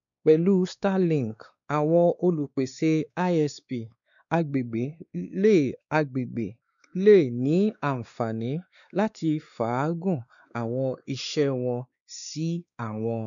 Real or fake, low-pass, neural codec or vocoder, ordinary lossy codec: fake; 7.2 kHz; codec, 16 kHz, 2 kbps, X-Codec, WavLM features, trained on Multilingual LibriSpeech; none